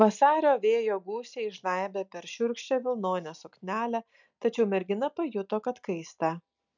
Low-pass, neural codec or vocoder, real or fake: 7.2 kHz; none; real